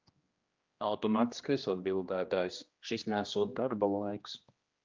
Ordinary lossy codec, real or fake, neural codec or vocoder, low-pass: Opus, 24 kbps; fake; codec, 16 kHz, 1 kbps, X-Codec, HuBERT features, trained on general audio; 7.2 kHz